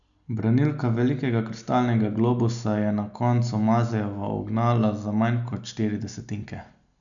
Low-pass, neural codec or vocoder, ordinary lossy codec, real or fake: 7.2 kHz; none; none; real